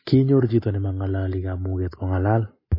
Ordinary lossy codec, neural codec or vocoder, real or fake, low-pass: MP3, 24 kbps; none; real; 5.4 kHz